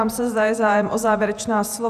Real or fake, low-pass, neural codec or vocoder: fake; 14.4 kHz; vocoder, 48 kHz, 128 mel bands, Vocos